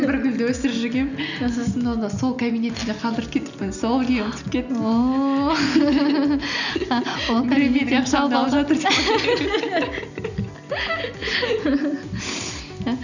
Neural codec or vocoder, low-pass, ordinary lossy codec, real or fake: none; 7.2 kHz; none; real